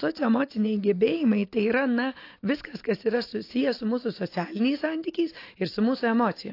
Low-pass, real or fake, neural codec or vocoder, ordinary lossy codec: 5.4 kHz; real; none; AAC, 32 kbps